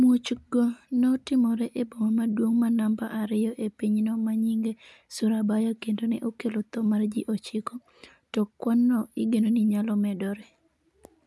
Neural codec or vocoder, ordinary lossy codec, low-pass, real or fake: none; none; none; real